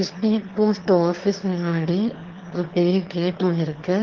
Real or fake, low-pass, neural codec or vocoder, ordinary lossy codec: fake; 7.2 kHz; autoencoder, 22.05 kHz, a latent of 192 numbers a frame, VITS, trained on one speaker; Opus, 16 kbps